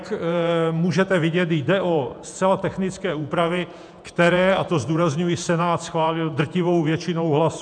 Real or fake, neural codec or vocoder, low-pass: fake; vocoder, 48 kHz, 128 mel bands, Vocos; 9.9 kHz